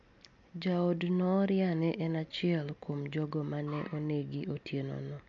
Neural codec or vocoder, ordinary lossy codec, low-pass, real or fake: none; MP3, 48 kbps; 7.2 kHz; real